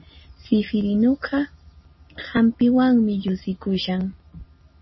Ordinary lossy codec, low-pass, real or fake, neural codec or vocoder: MP3, 24 kbps; 7.2 kHz; real; none